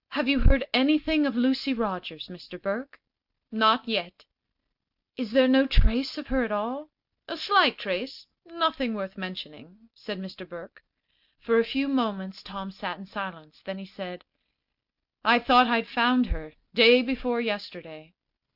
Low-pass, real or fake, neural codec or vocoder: 5.4 kHz; real; none